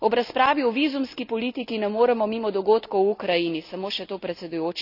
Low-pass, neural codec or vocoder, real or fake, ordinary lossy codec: 5.4 kHz; none; real; none